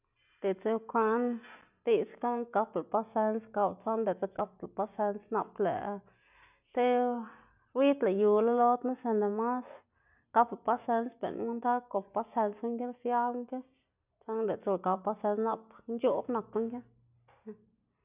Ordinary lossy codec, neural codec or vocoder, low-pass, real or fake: none; none; 3.6 kHz; real